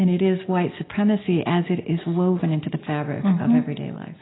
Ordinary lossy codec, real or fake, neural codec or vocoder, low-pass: AAC, 16 kbps; real; none; 7.2 kHz